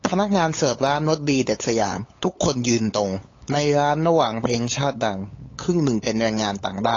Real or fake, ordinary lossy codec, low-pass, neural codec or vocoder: fake; AAC, 32 kbps; 7.2 kHz; codec, 16 kHz, 8 kbps, FunCodec, trained on LibriTTS, 25 frames a second